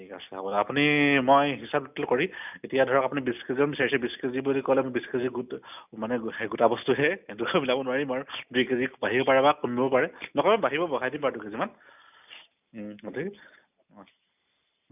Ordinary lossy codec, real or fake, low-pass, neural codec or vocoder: none; real; 3.6 kHz; none